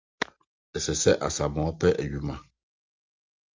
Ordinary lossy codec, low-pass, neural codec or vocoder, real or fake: Opus, 32 kbps; 7.2 kHz; none; real